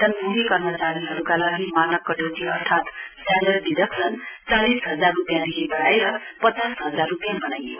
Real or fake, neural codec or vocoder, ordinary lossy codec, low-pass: real; none; none; 3.6 kHz